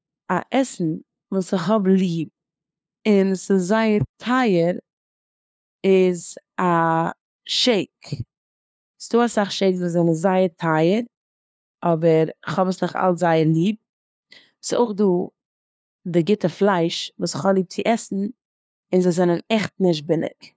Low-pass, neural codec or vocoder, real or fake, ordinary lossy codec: none; codec, 16 kHz, 2 kbps, FunCodec, trained on LibriTTS, 25 frames a second; fake; none